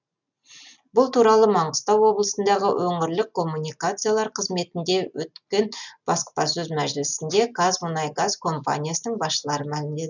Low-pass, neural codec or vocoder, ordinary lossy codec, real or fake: 7.2 kHz; none; none; real